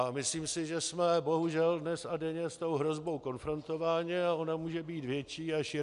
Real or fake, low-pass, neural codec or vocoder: real; 10.8 kHz; none